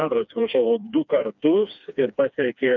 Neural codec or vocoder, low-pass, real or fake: codec, 16 kHz, 2 kbps, FreqCodec, smaller model; 7.2 kHz; fake